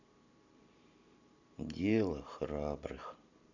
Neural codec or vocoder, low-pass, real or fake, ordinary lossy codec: vocoder, 44.1 kHz, 128 mel bands every 512 samples, BigVGAN v2; 7.2 kHz; fake; none